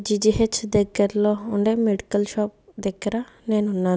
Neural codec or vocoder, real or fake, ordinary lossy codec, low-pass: none; real; none; none